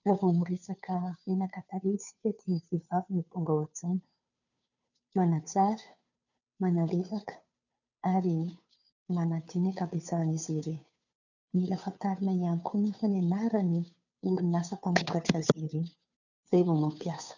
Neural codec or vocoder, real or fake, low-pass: codec, 16 kHz, 2 kbps, FunCodec, trained on Chinese and English, 25 frames a second; fake; 7.2 kHz